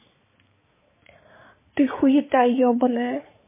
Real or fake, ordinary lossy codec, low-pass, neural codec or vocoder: fake; MP3, 16 kbps; 3.6 kHz; codec, 16 kHz, 4 kbps, X-Codec, HuBERT features, trained on balanced general audio